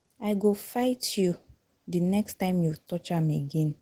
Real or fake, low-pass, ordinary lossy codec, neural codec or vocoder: real; 19.8 kHz; Opus, 16 kbps; none